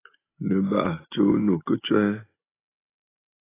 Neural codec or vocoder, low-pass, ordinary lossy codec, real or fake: none; 3.6 kHz; AAC, 16 kbps; real